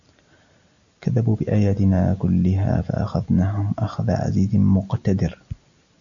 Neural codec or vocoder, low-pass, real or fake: none; 7.2 kHz; real